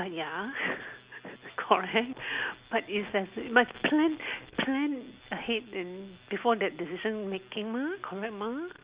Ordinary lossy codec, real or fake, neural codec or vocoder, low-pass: Opus, 64 kbps; real; none; 3.6 kHz